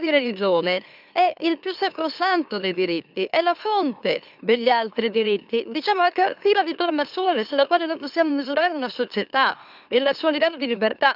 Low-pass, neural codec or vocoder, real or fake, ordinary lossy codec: 5.4 kHz; autoencoder, 44.1 kHz, a latent of 192 numbers a frame, MeloTTS; fake; none